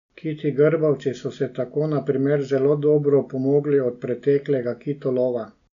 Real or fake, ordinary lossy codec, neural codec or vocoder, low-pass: real; none; none; 7.2 kHz